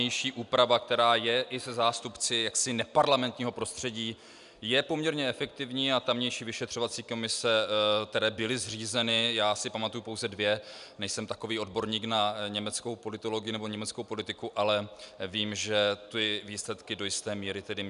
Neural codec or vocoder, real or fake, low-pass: none; real; 10.8 kHz